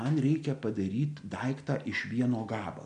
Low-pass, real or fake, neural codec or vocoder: 9.9 kHz; real; none